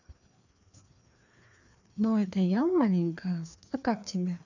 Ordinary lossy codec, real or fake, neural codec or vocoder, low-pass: none; fake; codec, 16 kHz, 2 kbps, FreqCodec, larger model; 7.2 kHz